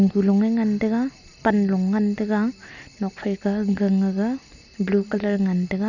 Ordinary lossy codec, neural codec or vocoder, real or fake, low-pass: none; none; real; 7.2 kHz